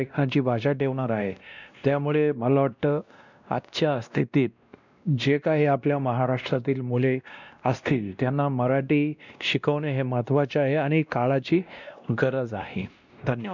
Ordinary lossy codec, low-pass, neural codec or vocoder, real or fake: none; 7.2 kHz; codec, 16 kHz, 1 kbps, X-Codec, WavLM features, trained on Multilingual LibriSpeech; fake